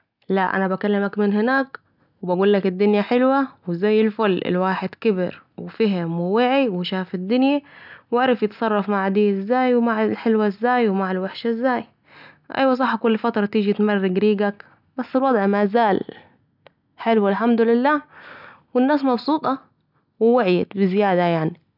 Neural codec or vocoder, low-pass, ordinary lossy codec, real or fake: none; 5.4 kHz; none; real